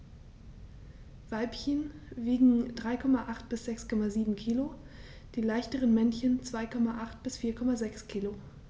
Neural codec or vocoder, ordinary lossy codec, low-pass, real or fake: none; none; none; real